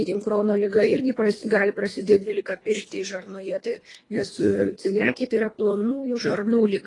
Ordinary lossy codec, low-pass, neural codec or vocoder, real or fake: AAC, 32 kbps; 10.8 kHz; codec, 24 kHz, 1.5 kbps, HILCodec; fake